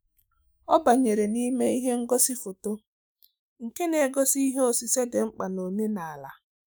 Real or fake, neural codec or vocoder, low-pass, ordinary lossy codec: fake; autoencoder, 48 kHz, 128 numbers a frame, DAC-VAE, trained on Japanese speech; none; none